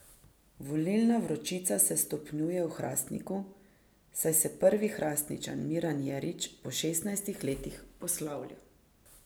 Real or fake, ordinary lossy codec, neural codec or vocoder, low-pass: real; none; none; none